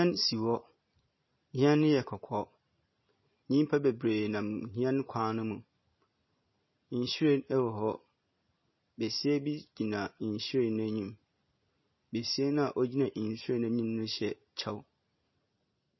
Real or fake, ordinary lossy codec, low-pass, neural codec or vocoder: real; MP3, 24 kbps; 7.2 kHz; none